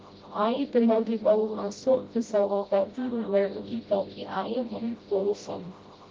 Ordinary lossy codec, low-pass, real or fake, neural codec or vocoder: Opus, 32 kbps; 7.2 kHz; fake; codec, 16 kHz, 0.5 kbps, FreqCodec, smaller model